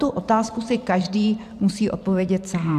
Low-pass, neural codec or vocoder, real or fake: 14.4 kHz; vocoder, 44.1 kHz, 128 mel bands every 512 samples, BigVGAN v2; fake